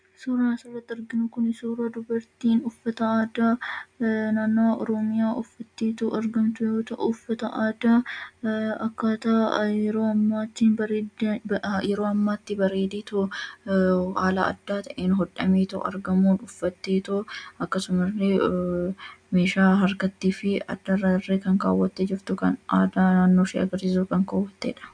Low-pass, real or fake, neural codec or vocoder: 9.9 kHz; real; none